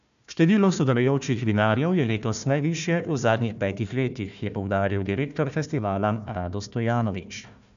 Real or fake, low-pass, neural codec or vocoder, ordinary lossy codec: fake; 7.2 kHz; codec, 16 kHz, 1 kbps, FunCodec, trained on Chinese and English, 50 frames a second; MP3, 96 kbps